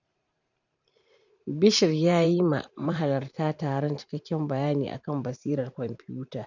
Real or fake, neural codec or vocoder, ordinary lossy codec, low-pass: fake; vocoder, 44.1 kHz, 128 mel bands every 512 samples, BigVGAN v2; none; 7.2 kHz